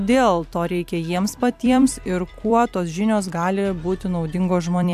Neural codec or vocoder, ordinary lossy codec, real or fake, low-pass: vocoder, 44.1 kHz, 128 mel bands every 256 samples, BigVGAN v2; AAC, 96 kbps; fake; 14.4 kHz